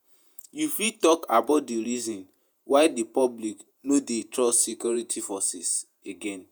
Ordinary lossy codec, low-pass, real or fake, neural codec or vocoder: none; none; fake; vocoder, 48 kHz, 128 mel bands, Vocos